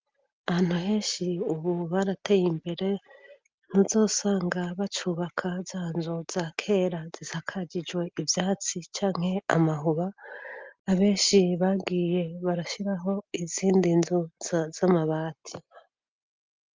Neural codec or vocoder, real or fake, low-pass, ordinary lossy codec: none; real; 7.2 kHz; Opus, 32 kbps